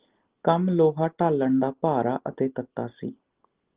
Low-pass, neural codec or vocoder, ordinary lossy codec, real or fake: 3.6 kHz; none; Opus, 16 kbps; real